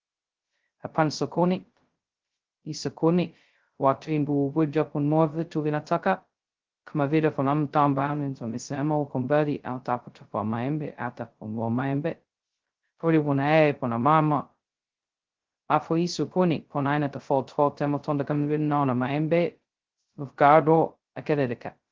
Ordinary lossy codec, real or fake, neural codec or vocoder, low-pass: Opus, 16 kbps; fake; codec, 16 kHz, 0.2 kbps, FocalCodec; 7.2 kHz